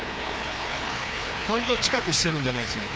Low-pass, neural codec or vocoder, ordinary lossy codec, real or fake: none; codec, 16 kHz, 2 kbps, FreqCodec, larger model; none; fake